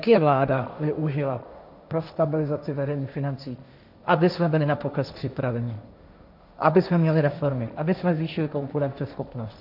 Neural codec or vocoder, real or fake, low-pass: codec, 16 kHz, 1.1 kbps, Voila-Tokenizer; fake; 5.4 kHz